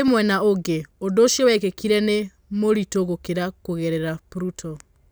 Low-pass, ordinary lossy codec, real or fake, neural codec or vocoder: none; none; real; none